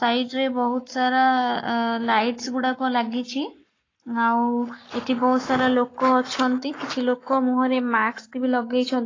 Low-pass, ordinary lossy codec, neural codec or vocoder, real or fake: 7.2 kHz; AAC, 32 kbps; codec, 44.1 kHz, 7.8 kbps, Pupu-Codec; fake